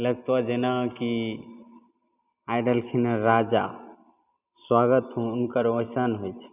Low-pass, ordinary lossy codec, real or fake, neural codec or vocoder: 3.6 kHz; none; real; none